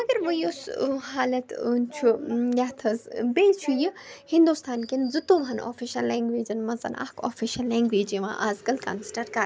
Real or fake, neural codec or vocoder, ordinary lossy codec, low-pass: real; none; none; none